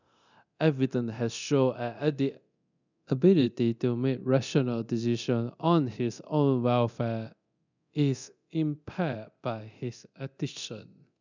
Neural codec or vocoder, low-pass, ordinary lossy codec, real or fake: codec, 24 kHz, 0.9 kbps, DualCodec; 7.2 kHz; none; fake